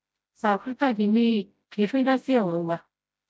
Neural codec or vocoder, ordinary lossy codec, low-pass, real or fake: codec, 16 kHz, 0.5 kbps, FreqCodec, smaller model; none; none; fake